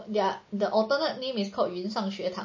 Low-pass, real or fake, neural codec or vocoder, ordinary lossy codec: 7.2 kHz; real; none; MP3, 32 kbps